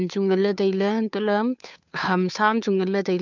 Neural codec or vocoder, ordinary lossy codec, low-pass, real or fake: codec, 16 kHz, 2 kbps, FunCodec, trained on Chinese and English, 25 frames a second; none; 7.2 kHz; fake